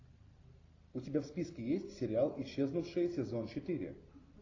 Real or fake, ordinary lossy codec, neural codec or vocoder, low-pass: real; AAC, 32 kbps; none; 7.2 kHz